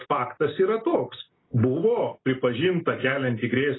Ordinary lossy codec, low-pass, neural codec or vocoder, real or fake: AAC, 16 kbps; 7.2 kHz; none; real